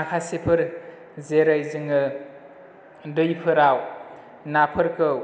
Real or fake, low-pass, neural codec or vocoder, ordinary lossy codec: real; none; none; none